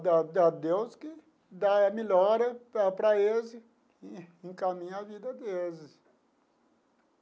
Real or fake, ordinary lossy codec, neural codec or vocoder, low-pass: real; none; none; none